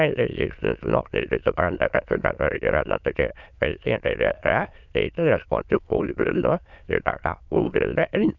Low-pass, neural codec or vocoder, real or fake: 7.2 kHz; autoencoder, 22.05 kHz, a latent of 192 numbers a frame, VITS, trained on many speakers; fake